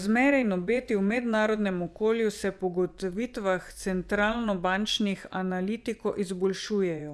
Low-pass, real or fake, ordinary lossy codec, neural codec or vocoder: none; fake; none; vocoder, 24 kHz, 100 mel bands, Vocos